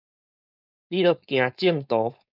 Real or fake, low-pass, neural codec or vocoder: fake; 5.4 kHz; codec, 16 kHz, 4.8 kbps, FACodec